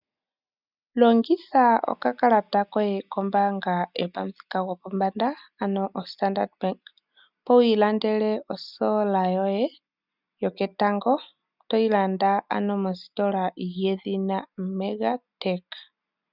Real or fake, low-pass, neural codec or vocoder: real; 5.4 kHz; none